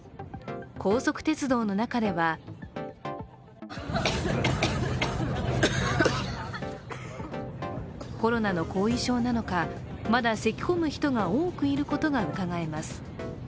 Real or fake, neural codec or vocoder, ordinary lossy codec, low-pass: real; none; none; none